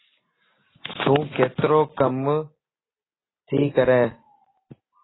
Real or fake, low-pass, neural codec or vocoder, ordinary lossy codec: real; 7.2 kHz; none; AAC, 16 kbps